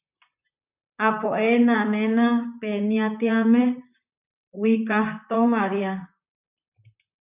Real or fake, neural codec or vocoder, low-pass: fake; vocoder, 44.1 kHz, 128 mel bands, Pupu-Vocoder; 3.6 kHz